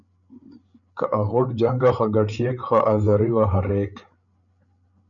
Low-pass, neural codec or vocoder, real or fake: 7.2 kHz; codec, 16 kHz, 8 kbps, FreqCodec, larger model; fake